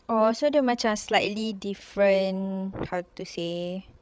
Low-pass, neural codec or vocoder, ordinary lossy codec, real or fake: none; codec, 16 kHz, 16 kbps, FreqCodec, larger model; none; fake